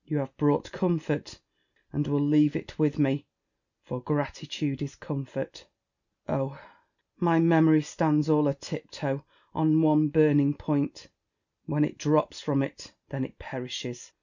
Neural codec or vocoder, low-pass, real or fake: none; 7.2 kHz; real